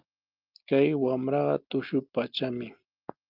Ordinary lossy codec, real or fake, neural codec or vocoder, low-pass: Opus, 32 kbps; real; none; 5.4 kHz